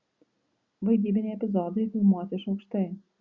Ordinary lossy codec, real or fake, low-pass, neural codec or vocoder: Opus, 64 kbps; real; 7.2 kHz; none